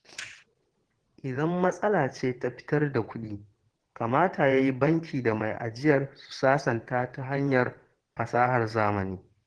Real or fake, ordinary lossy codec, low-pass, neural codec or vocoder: fake; Opus, 16 kbps; 9.9 kHz; vocoder, 22.05 kHz, 80 mel bands, WaveNeXt